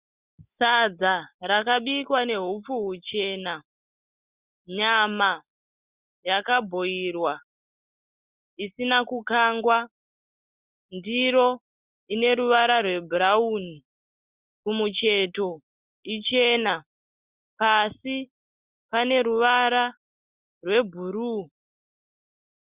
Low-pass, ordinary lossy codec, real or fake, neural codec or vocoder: 3.6 kHz; Opus, 64 kbps; real; none